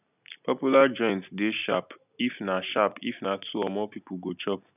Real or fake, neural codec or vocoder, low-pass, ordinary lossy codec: real; none; 3.6 kHz; none